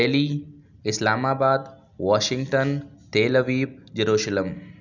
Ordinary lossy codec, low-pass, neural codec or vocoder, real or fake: none; 7.2 kHz; none; real